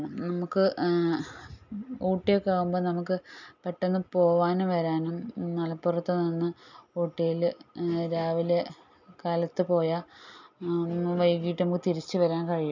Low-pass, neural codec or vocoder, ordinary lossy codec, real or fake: 7.2 kHz; none; none; real